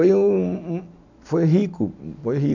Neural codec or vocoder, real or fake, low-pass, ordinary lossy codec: none; real; 7.2 kHz; none